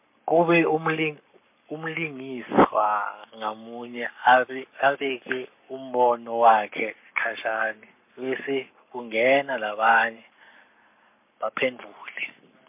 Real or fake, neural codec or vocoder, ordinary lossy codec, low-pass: fake; codec, 44.1 kHz, 7.8 kbps, Pupu-Codec; MP3, 32 kbps; 3.6 kHz